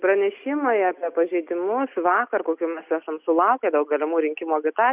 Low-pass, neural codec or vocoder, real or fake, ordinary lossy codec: 3.6 kHz; none; real; Opus, 64 kbps